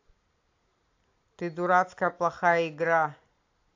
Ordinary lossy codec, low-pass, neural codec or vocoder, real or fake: AAC, 48 kbps; 7.2 kHz; none; real